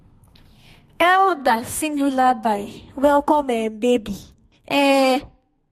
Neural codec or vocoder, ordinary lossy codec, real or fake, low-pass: codec, 32 kHz, 1.9 kbps, SNAC; MP3, 64 kbps; fake; 14.4 kHz